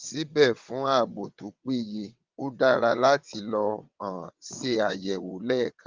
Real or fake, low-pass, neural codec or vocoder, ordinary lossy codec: fake; 7.2 kHz; vocoder, 22.05 kHz, 80 mel bands, WaveNeXt; Opus, 32 kbps